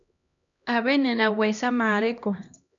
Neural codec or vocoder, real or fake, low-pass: codec, 16 kHz, 1 kbps, X-Codec, HuBERT features, trained on LibriSpeech; fake; 7.2 kHz